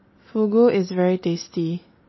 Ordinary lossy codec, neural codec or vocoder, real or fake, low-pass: MP3, 24 kbps; none; real; 7.2 kHz